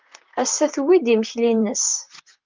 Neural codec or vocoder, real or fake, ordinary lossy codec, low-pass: vocoder, 44.1 kHz, 128 mel bands, Pupu-Vocoder; fake; Opus, 24 kbps; 7.2 kHz